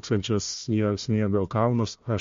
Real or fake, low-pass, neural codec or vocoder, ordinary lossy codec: fake; 7.2 kHz; codec, 16 kHz, 1 kbps, FunCodec, trained on Chinese and English, 50 frames a second; MP3, 48 kbps